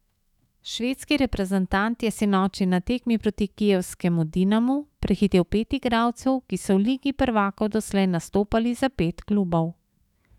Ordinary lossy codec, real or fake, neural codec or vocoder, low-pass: none; fake; autoencoder, 48 kHz, 128 numbers a frame, DAC-VAE, trained on Japanese speech; 19.8 kHz